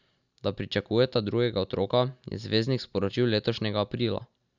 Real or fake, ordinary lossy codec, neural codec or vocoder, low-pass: real; none; none; 7.2 kHz